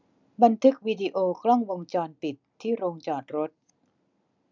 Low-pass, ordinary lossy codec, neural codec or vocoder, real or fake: 7.2 kHz; none; none; real